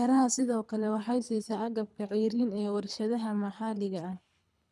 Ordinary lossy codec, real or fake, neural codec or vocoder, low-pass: none; fake; codec, 24 kHz, 3 kbps, HILCodec; none